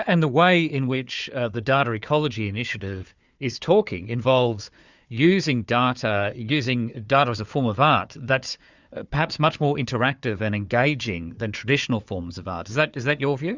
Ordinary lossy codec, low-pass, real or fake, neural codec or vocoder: Opus, 64 kbps; 7.2 kHz; fake; codec, 16 kHz, 4 kbps, FunCodec, trained on Chinese and English, 50 frames a second